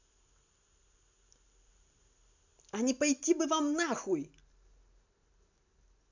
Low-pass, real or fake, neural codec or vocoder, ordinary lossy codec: 7.2 kHz; real; none; none